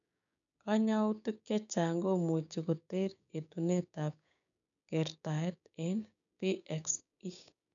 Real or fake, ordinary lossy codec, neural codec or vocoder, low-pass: fake; none; codec, 16 kHz, 6 kbps, DAC; 7.2 kHz